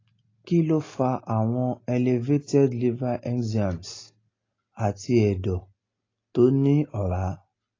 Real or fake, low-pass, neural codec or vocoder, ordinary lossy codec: real; 7.2 kHz; none; AAC, 32 kbps